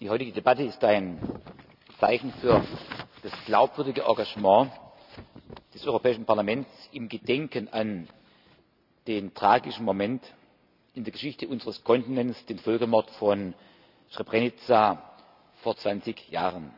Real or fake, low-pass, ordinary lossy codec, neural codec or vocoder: real; 5.4 kHz; none; none